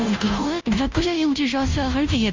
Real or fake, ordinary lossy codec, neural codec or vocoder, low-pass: fake; MP3, 64 kbps; codec, 16 kHz, 0.5 kbps, FunCodec, trained on Chinese and English, 25 frames a second; 7.2 kHz